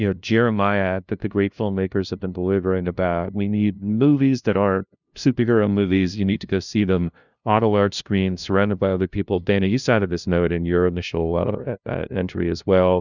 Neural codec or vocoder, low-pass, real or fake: codec, 16 kHz, 0.5 kbps, FunCodec, trained on LibriTTS, 25 frames a second; 7.2 kHz; fake